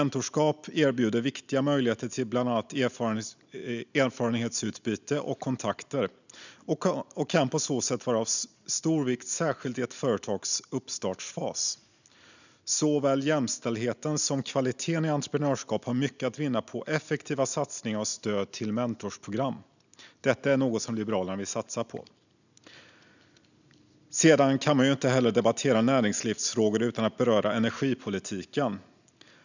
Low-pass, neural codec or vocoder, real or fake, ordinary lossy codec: 7.2 kHz; none; real; none